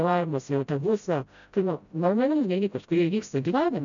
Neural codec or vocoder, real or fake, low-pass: codec, 16 kHz, 0.5 kbps, FreqCodec, smaller model; fake; 7.2 kHz